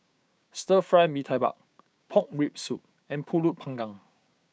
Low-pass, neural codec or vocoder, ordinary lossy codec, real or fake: none; codec, 16 kHz, 6 kbps, DAC; none; fake